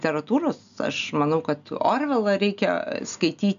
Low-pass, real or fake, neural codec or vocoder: 7.2 kHz; real; none